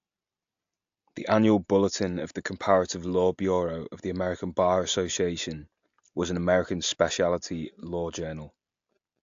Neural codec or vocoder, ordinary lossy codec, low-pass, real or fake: none; AAC, 64 kbps; 7.2 kHz; real